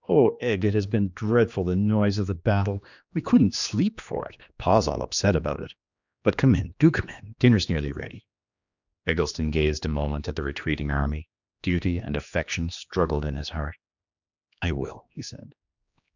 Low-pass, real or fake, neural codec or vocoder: 7.2 kHz; fake; codec, 16 kHz, 2 kbps, X-Codec, HuBERT features, trained on general audio